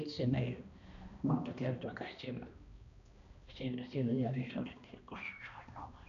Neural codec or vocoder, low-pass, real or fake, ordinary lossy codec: codec, 16 kHz, 1 kbps, X-Codec, HuBERT features, trained on balanced general audio; 7.2 kHz; fake; none